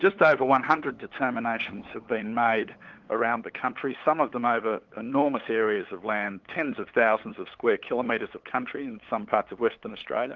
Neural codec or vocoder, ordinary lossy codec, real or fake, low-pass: codec, 16 kHz, 8 kbps, FunCodec, trained on LibriTTS, 25 frames a second; Opus, 16 kbps; fake; 7.2 kHz